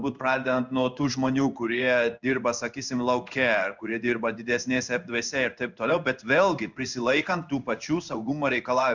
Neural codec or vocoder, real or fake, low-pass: codec, 16 kHz in and 24 kHz out, 1 kbps, XY-Tokenizer; fake; 7.2 kHz